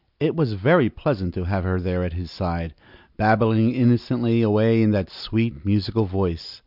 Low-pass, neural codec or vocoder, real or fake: 5.4 kHz; none; real